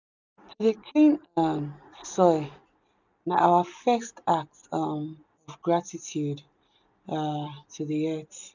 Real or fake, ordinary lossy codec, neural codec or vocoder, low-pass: real; none; none; 7.2 kHz